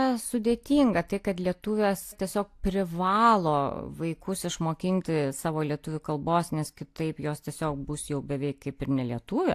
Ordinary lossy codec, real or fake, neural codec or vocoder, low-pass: AAC, 64 kbps; real; none; 14.4 kHz